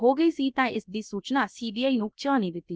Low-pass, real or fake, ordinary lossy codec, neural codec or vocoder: none; fake; none; codec, 16 kHz, about 1 kbps, DyCAST, with the encoder's durations